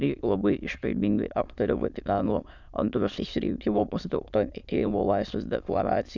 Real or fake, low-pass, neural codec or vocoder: fake; 7.2 kHz; autoencoder, 22.05 kHz, a latent of 192 numbers a frame, VITS, trained on many speakers